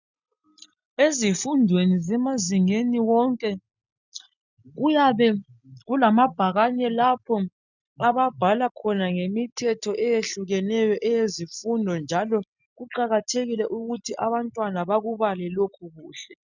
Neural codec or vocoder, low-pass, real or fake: none; 7.2 kHz; real